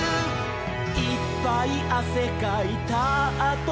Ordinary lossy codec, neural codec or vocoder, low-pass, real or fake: none; none; none; real